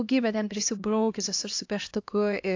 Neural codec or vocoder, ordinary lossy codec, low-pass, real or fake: codec, 16 kHz, 1 kbps, X-Codec, HuBERT features, trained on LibriSpeech; AAC, 48 kbps; 7.2 kHz; fake